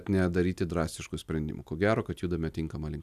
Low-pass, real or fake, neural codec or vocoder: 14.4 kHz; real; none